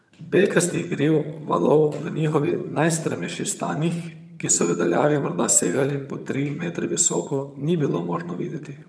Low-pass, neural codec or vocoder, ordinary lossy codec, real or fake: none; vocoder, 22.05 kHz, 80 mel bands, HiFi-GAN; none; fake